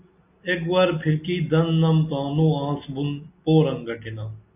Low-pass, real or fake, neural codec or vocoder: 3.6 kHz; real; none